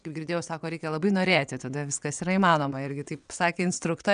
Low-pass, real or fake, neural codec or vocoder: 9.9 kHz; fake; vocoder, 22.05 kHz, 80 mel bands, WaveNeXt